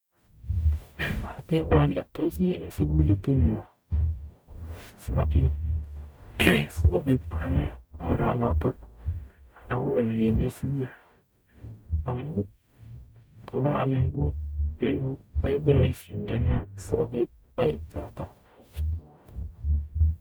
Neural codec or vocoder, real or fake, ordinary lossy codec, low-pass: codec, 44.1 kHz, 0.9 kbps, DAC; fake; none; none